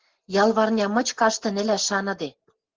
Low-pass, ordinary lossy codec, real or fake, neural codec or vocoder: 7.2 kHz; Opus, 16 kbps; real; none